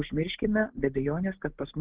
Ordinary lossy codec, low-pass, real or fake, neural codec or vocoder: Opus, 16 kbps; 3.6 kHz; real; none